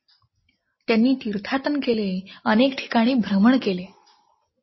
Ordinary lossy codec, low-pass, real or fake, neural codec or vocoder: MP3, 24 kbps; 7.2 kHz; real; none